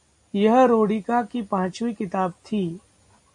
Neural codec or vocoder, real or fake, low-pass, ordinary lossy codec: none; real; 10.8 kHz; MP3, 48 kbps